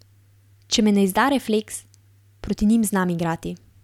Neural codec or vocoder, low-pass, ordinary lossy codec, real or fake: none; 19.8 kHz; none; real